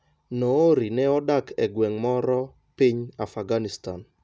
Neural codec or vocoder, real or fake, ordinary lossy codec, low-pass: none; real; none; none